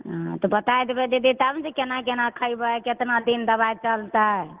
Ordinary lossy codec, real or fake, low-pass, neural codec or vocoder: Opus, 16 kbps; real; 3.6 kHz; none